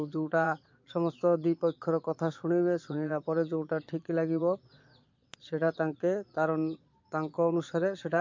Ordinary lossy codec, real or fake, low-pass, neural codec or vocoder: MP3, 48 kbps; fake; 7.2 kHz; vocoder, 22.05 kHz, 80 mel bands, Vocos